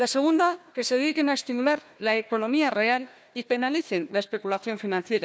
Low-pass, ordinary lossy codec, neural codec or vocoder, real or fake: none; none; codec, 16 kHz, 1 kbps, FunCodec, trained on Chinese and English, 50 frames a second; fake